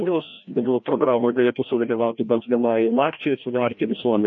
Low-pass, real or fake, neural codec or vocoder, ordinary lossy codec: 7.2 kHz; fake; codec, 16 kHz, 1 kbps, FreqCodec, larger model; MP3, 48 kbps